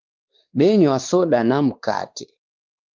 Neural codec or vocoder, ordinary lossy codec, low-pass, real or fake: codec, 16 kHz, 2 kbps, X-Codec, WavLM features, trained on Multilingual LibriSpeech; Opus, 32 kbps; 7.2 kHz; fake